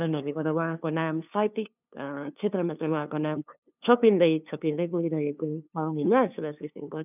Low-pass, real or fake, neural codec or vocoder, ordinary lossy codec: 3.6 kHz; fake; codec, 16 kHz, 2 kbps, FunCodec, trained on LibriTTS, 25 frames a second; none